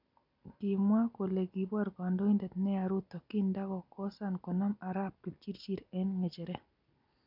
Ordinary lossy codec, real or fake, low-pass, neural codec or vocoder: none; real; 5.4 kHz; none